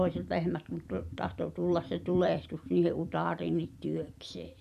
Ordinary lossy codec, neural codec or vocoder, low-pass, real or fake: none; vocoder, 44.1 kHz, 128 mel bands every 256 samples, BigVGAN v2; 14.4 kHz; fake